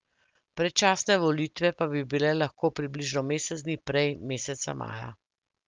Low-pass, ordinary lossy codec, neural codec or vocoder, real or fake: 7.2 kHz; Opus, 24 kbps; none; real